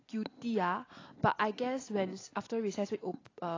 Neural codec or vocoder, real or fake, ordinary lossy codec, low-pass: none; real; AAC, 32 kbps; 7.2 kHz